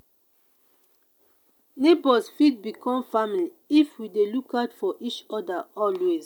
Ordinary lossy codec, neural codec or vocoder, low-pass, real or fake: none; none; 19.8 kHz; real